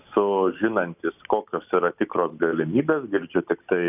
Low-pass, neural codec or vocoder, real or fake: 3.6 kHz; none; real